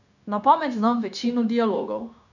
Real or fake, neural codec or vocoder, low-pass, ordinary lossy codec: fake; codec, 16 kHz, 0.9 kbps, LongCat-Audio-Codec; 7.2 kHz; none